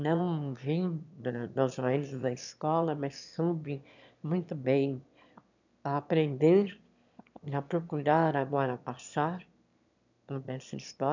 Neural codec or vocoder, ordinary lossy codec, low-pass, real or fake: autoencoder, 22.05 kHz, a latent of 192 numbers a frame, VITS, trained on one speaker; none; 7.2 kHz; fake